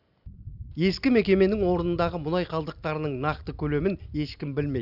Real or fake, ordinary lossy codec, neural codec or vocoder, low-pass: real; none; none; 5.4 kHz